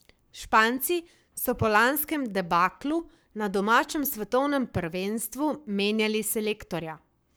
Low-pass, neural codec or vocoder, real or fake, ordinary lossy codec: none; codec, 44.1 kHz, 7.8 kbps, Pupu-Codec; fake; none